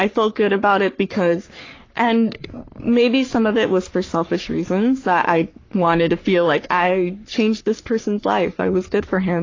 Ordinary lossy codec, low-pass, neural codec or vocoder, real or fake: AAC, 32 kbps; 7.2 kHz; codec, 44.1 kHz, 3.4 kbps, Pupu-Codec; fake